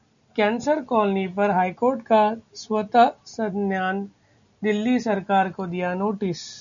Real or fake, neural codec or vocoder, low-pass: real; none; 7.2 kHz